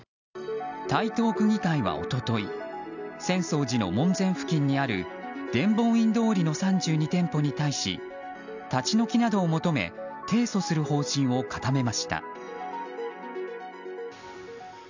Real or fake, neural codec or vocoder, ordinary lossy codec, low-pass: real; none; none; 7.2 kHz